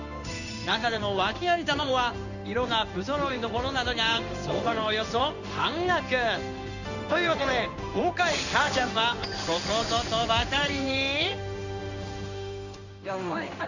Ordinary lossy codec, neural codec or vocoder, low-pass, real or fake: none; codec, 16 kHz in and 24 kHz out, 1 kbps, XY-Tokenizer; 7.2 kHz; fake